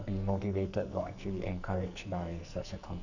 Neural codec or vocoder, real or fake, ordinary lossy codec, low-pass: codec, 44.1 kHz, 2.6 kbps, SNAC; fake; none; 7.2 kHz